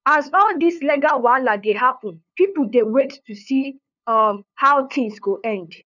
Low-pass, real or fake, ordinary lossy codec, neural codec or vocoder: 7.2 kHz; fake; none; codec, 16 kHz, 2 kbps, FunCodec, trained on LibriTTS, 25 frames a second